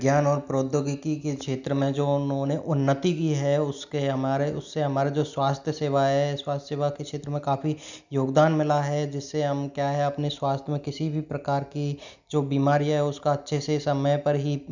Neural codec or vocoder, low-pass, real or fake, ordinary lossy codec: none; 7.2 kHz; real; none